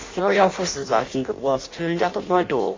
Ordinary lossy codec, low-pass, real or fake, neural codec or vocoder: AAC, 32 kbps; 7.2 kHz; fake; codec, 16 kHz in and 24 kHz out, 0.6 kbps, FireRedTTS-2 codec